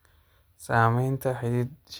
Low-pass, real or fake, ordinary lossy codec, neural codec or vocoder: none; real; none; none